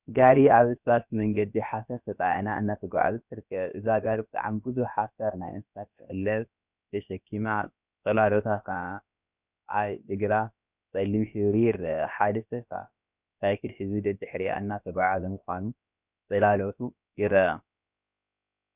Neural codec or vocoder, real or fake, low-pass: codec, 16 kHz, about 1 kbps, DyCAST, with the encoder's durations; fake; 3.6 kHz